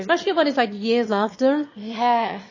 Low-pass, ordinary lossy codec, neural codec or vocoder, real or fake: 7.2 kHz; MP3, 32 kbps; autoencoder, 22.05 kHz, a latent of 192 numbers a frame, VITS, trained on one speaker; fake